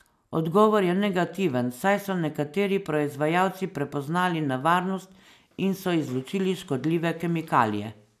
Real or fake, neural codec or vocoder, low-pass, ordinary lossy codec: real; none; 14.4 kHz; none